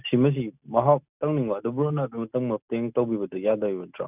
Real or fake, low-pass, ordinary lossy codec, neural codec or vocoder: real; 3.6 kHz; none; none